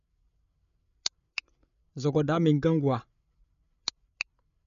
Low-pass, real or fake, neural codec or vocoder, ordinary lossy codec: 7.2 kHz; fake; codec, 16 kHz, 8 kbps, FreqCodec, larger model; none